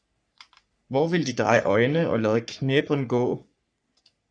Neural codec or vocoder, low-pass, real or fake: codec, 44.1 kHz, 3.4 kbps, Pupu-Codec; 9.9 kHz; fake